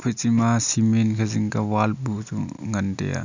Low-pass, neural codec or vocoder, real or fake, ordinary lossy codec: 7.2 kHz; none; real; none